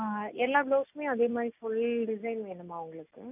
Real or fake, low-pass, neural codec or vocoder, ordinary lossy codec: real; 3.6 kHz; none; none